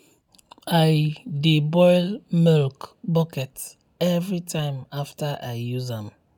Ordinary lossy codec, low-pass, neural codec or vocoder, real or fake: none; 19.8 kHz; none; real